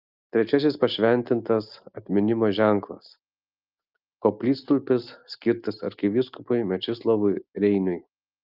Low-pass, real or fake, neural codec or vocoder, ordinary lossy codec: 5.4 kHz; real; none; Opus, 32 kbps